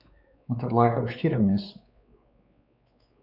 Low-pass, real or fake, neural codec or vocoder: 5.4 kHz; fake; codec, 16 kHz, 4 kbps, X-Codec, WavLM features, trained on Multilingual LibriSpeech